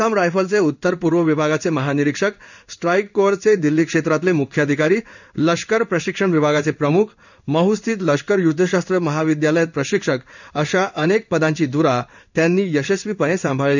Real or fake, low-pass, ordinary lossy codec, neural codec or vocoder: fake; 7.2 kHz; none; codec, 16 kHz in and 24 kHz out, 1 kbps, XY-Tokenizer